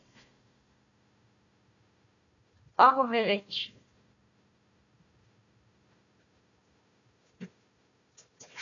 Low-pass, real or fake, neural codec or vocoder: 7.2 kHz; fake; codec, 16 kHz, 1 kbps, FunCodec, trained on Chinese and English, 50 frames a second